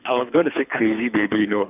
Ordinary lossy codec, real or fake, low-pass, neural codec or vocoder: none; fake; 3.6 kHz; codec, 24 kHz, 3 kbps, HILCodec